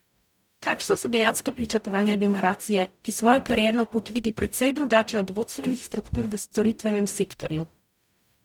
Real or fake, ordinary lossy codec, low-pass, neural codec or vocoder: fake; none; 19.8 kHz; codec, 44.1 kHz, 0.9 kbps, DAC